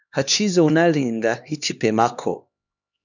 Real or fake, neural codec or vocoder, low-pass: fake; codec, 16 kHz, 2 kbps, X-Codec, HuBERT features, trained on LibriSpeech; 7.2 kHz